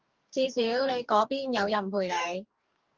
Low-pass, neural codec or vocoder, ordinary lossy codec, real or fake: 7.2 kHz; codec, 44.1 kHz, 2.6 kbps, DAC; Opus, 32 kbps; fake